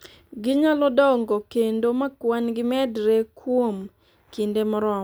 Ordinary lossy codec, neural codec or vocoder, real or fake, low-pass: none; none; real; none